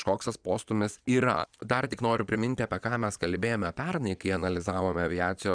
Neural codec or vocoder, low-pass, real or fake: vocoder, 22.05 kHz, 80 mel bands, Vocos; 9.9 kHz; fake